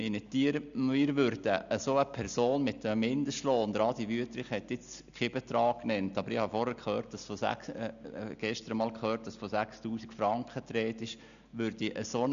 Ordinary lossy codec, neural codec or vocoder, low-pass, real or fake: none; none; 7.2 kHz; real